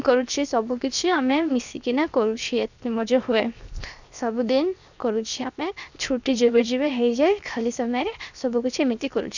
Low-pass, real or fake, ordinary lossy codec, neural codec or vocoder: 7.2 kHz; fake; none; codec, 16 kHz, 0.7 kbps, FocalCodec